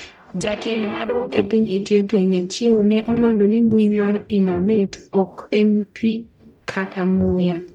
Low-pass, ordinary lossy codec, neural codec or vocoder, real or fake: 19.8 kHz; none; codec, 44.1 kHz, 0.9 kbps, DAC; fake